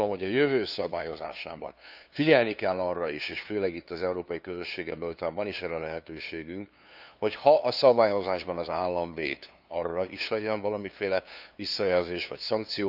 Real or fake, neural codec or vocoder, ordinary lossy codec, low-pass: fake; codec, 16 kHz, 2 kbps, FunCodec, trained on LibriTTS, 25 frames a second; none; 5.4 kHz